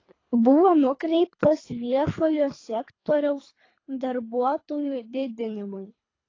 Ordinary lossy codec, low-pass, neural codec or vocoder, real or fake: AAC, 32 kbps; 7.2 kHz; codec, 24 kHz, 3 kbps, HILCodec; fake